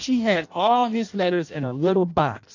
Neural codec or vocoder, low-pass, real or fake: codec, 16 kHz in and 24 kHz out, 0.6 kbps, FireRedTTS-2 codec; 7.2 kHz; fake